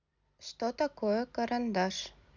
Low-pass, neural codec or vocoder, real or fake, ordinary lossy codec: 7.2 kHz; none; real; none